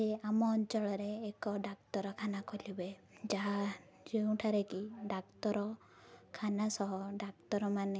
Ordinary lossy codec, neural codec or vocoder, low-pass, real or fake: none; none; none; real